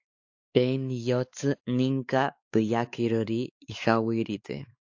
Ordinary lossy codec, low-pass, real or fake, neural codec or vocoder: AAC, 48 kbps; 7.2 kHz; fake; codec, 16 kHz, 4 kbps, X-Codec, WavLM features, trained on Multilingual LibriSpeech